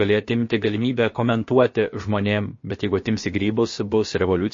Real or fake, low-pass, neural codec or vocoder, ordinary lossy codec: fake; 7.2 kHz; codec, 16 kHz, about 1 kbps, DyCAST, with the encoder's durations; MP3, 32 kbps